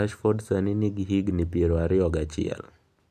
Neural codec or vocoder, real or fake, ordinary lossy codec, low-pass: none; real; none; 14.4 kHz